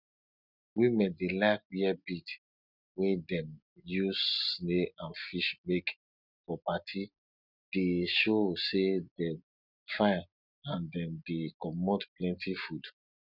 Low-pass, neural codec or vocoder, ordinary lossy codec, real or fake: 5.4 kHz; none; none; real